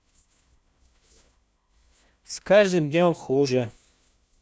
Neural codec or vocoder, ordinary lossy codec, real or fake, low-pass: codec, 16 kHz, 1 kbps, FunCodec, trained on LibriTTS, 50 frames a second; none; fake; none